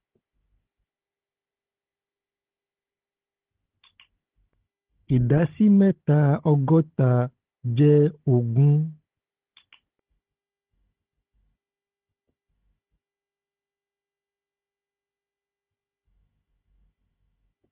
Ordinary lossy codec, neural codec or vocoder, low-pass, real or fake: Opus, 16 kbps; codec, 16 kHz, 16 kbps, FunCodec, trained on Chinese and English, 50 frames a second; 3.6 kHz; fake